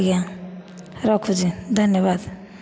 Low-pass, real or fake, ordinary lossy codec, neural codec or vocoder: none; real; none; none